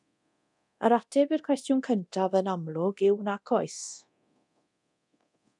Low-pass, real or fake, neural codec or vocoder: 10.8 kHz; fake; codec, 24 kHz, 0.9 kbps, DualCodec